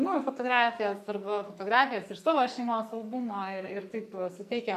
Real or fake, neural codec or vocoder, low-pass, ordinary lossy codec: fake; codec, 44.1 kHz, 2.6 kbps, SNAC; 14.4 kHz; AAC, 96 kbps